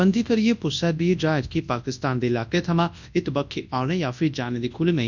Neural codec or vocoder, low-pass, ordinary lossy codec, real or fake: codec, 24 kHz, 0.9 kbps, WavTokenizer, large speech release; 7.2 kHz; none; fake